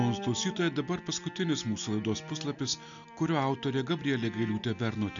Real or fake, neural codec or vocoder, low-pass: real; none; 7.2 kHz